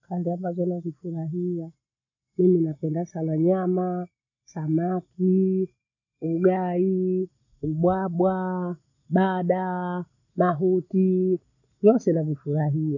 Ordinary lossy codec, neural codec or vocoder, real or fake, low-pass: none; none; real; 7.2 kHz